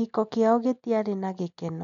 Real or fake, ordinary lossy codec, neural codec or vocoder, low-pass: real; none; none; 7.2 kHz